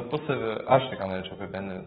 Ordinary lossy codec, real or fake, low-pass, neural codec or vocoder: AAC, 16 kbps; fake; 19.8 kHz; codec, 44.1 kHz, 7.8 kbps, DAC